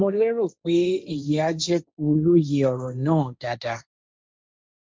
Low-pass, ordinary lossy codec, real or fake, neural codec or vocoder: none; none; fake; codec, 16 kHz, 1.1 kbps, Voila-Tokenizer